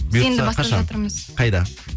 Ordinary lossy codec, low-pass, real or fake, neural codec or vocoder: none; none; real; none